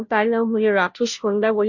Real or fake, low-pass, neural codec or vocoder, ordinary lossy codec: fake; 7.2 kHz; codec, 16 kHz, 0.5 kbps, FunCodec, trained on Chinese and English, 25 frames a second; none